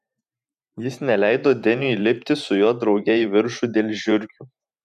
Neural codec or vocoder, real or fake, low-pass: vocoder, 44.1 kHz, 128 mel bands every 512 samples, BigVGAN v2; fake; 14.4 kHz